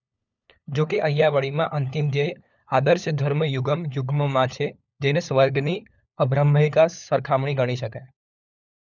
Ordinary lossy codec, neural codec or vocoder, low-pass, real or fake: none; codec, 16 kHz, 4 kbps, FunCodec, trained on LibriTTS, 50 frames a second; 7.2 kHz; fake